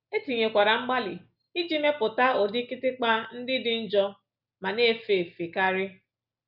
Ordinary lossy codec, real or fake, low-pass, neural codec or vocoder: none; real; 5.4 kHz; none